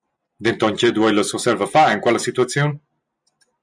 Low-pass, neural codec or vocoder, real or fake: 9.9 kHz; none; real